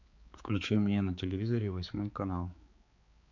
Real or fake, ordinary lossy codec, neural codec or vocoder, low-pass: fake; AAC, 48 kbps; codec, 16 kHz, 4 kbps, X-Codec, HuBERT features, trained on balanced general audio; 7.2 kHz